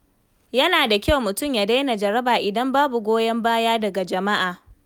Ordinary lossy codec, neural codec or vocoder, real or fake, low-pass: none; none; real; none